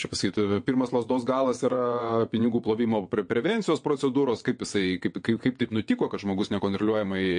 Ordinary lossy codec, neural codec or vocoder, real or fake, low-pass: MP3, 48 kbps; vocoder, 22.05 kHz, 80 mel bands, WaveNeXt; fake; 9.9 kHz